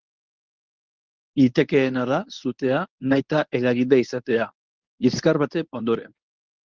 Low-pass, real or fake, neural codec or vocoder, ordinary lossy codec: 7.2 kHz; fake; codec, 24 kHz, 0.9 kbps, WavTokenizer, medium speech release version 1; Opus, 32 kbps